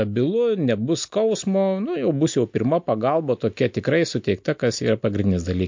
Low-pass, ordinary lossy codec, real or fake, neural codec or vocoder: 7.2 kHz; MP3, 48 kbps; real; none